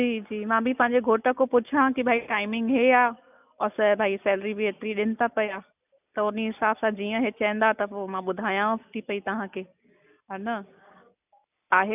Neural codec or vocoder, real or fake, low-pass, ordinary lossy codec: none; real; 3.6 kHz; none